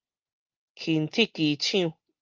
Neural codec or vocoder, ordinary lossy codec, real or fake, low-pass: none; Opus, 32 kbps; real; 7.2 kHz